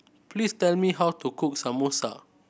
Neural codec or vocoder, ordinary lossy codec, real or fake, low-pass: none; none; real; none